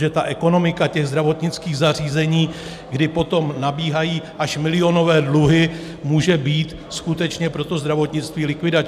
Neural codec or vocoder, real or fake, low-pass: none; real; 14.4 kHz